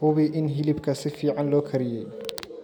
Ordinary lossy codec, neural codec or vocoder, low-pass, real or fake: none; none; none; real